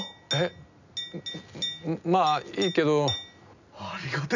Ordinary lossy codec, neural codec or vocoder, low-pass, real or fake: none; none; 7.2 kHz; real